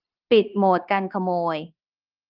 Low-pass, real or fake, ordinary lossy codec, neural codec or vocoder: 5.4 kHz; fake; Opus, 32 kbps; codec, 16 kHz, 0.9 kbps, LongCat-Audio-Codec